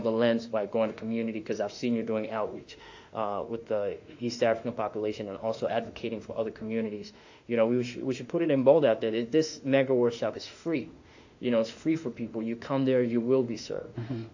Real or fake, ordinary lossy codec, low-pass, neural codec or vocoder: fake; AAC, 48 kbps; 7.2 kHz; autoencoder, 48 kHz, 32 numbers a frame, DAC-VAE, trained on Japanese speech